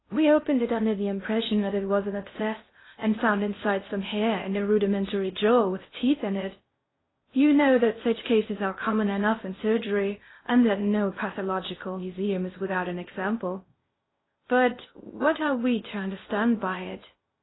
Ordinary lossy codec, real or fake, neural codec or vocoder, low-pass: AAC, 16 kbps; fake; codec, 16 kHz in and 24 kHz out, 0.6 kbps, FocalCodec, streaming, 2048 codes; 7.2 kHz